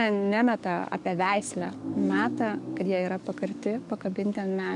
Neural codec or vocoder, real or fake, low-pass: codec, 44.1 kHz, 7.8 kbps, DAC; fake; 10.8 kHz